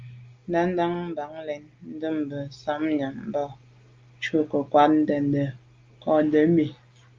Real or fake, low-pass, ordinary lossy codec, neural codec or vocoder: real; 7.2 kHz; Opus, 32 kbps; none